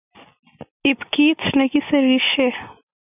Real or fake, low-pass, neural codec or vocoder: real; 3.6 kHz; none